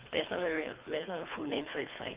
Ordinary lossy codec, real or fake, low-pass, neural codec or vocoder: Opus, 32 kbps; fake; 3.6 kHz; codec, 24 kHz, 3 kbps, HILCodec